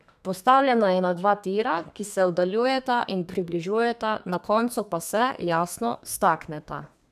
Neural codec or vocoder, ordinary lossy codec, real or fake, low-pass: codec, 32 kHz, 1.9 kbps, SNAC; none; fake; 14.4 kHz